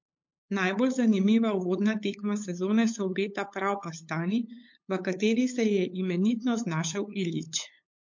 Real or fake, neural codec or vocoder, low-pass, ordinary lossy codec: fake; codec, 16 kHz, 8 kbps, FunCodec, trained on LibriTTS, 25 frames a second; 7.2 kHz; MP3, 48 kbps